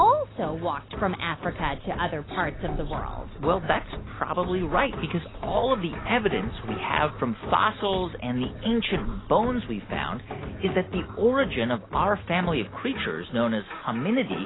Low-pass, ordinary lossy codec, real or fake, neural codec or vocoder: 7.2 kHz; AAC, 16 kbps; real; none